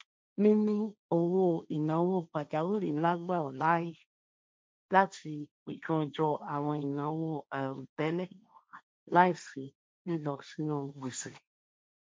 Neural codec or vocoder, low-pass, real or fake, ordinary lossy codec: codec, 16 kHz, 1.1 kbps, Voila-Tokenizer; none; fake; none